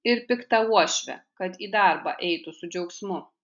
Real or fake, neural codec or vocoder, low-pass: real; none; 7.2 kHz